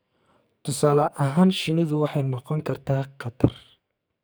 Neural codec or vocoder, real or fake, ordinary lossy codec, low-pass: codec, 44.1 kHz, 2.6 kbps, SNAC; fake; none; none